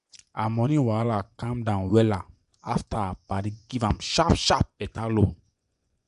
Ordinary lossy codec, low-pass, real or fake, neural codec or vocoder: none; 10.8 kHz; fake; vocoder, 24 kHz, 100 mel bands, Vocos